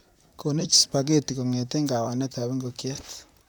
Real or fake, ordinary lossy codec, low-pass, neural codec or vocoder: fake; none; none; vocoder, 44.1 kHz, 128 mel bands, Pupu-Vocoder